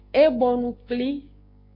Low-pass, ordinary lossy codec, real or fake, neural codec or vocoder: 5.4 kHz; Opus, 64 kbps; fake; codec, 16 kHz, 6 kbps, DAC